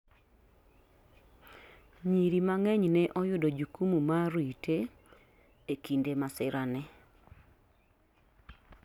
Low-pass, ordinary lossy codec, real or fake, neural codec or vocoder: 19.8 kHz; none; real; none